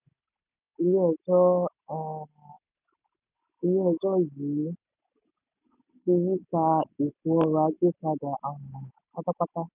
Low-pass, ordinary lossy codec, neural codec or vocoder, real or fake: 3.6 kHz; none; none; real